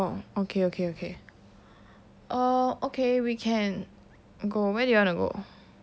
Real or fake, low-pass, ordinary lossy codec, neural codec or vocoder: real; none; none; none